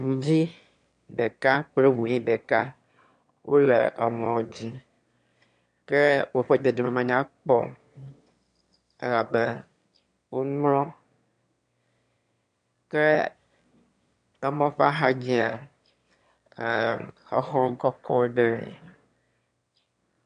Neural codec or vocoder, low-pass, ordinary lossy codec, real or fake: autoencoder, 22.05 kHz, a latent of 192 numbers a frame, VITS, trained on one speaker; 9.9 kHz; MP3, 64 kbps; fake